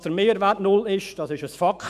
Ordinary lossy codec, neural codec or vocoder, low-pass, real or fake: none; autoencoder, 48 kHz, 128 numbers a frame, DAC-VAE, trained on Japanese speech; 14.4 kHz; fake